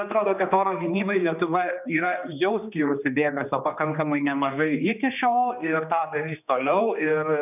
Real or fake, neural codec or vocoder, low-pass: fake; codec, 16 kHz, 2 kbps, X-Codec, HuBERT features, trained on general audio; 3.6 kHz